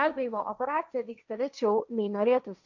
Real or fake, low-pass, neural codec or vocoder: fake; 7.2 kHz; codec, 16 kHz, 1.1 kbps, Voila-Tokenizer